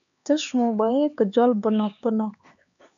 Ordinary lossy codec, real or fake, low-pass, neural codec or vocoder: MP3, 96 kbps; fake; 7.2 kHz; codec, 16 kHz, 4 kbps, X-Codec, HuBERT features, trained on LibriSpeech